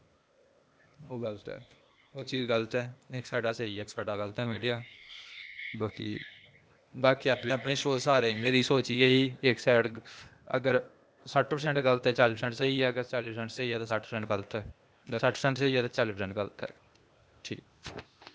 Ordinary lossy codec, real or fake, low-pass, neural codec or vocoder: none; fake; none; codec, 16 kHz, 0.8 kbps, ZipCodec